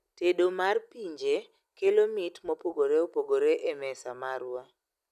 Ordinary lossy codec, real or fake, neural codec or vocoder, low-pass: none; real; none; 14.4 kHz